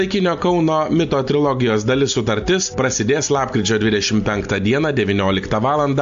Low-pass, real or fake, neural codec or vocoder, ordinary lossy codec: 7.2 kHz; real; none; AAC, 64 kbps